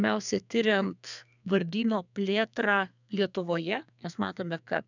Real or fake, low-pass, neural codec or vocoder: fake; 7.2 kHz; codec, 32 kHz, 1.9 kbps, SNAC